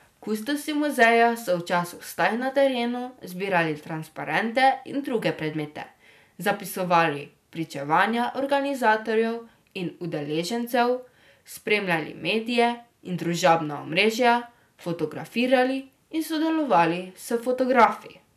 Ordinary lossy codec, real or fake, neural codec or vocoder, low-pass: none; real; none; 14.4 kHz